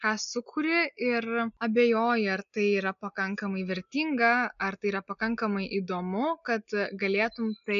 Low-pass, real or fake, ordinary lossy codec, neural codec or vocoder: 7.2 kHz; real; MP3, 96 kbps; none